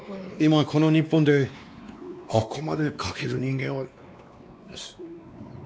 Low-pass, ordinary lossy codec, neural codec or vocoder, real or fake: none; none; codec, 16 kHz, 2 kbps, X-Codec, WavLM features, trained on Multilingual LibriSpeech; fake